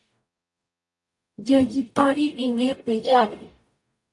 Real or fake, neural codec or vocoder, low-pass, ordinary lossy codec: fake; codec, 44.1 kHz, 0.9 kbps, DAC; 10.8 kHz; AAC, 64 kbps